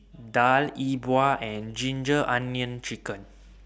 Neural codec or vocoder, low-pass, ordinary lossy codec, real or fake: none; none; none; real